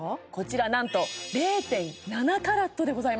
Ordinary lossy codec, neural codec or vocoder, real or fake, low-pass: none; none; real; none